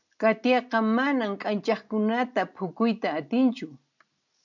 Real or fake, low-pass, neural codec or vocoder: real; 7.2 kHz; none